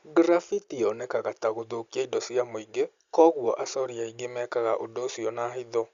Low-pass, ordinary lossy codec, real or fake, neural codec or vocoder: 7.2 kHz; Opus, 64 kbps; real; none